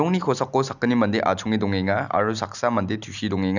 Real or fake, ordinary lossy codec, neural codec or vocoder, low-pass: real; none; none; 7.2 kHz